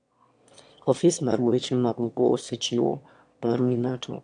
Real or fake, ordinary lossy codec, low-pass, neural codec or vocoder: fake; AAC, 64 kbps; 9.9 kHz; autoencoder, 22.05 kHz, a latent of 192 numbers a frame, VITS, trained on one speaker